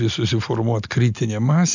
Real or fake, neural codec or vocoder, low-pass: real; none; 7.2 kHz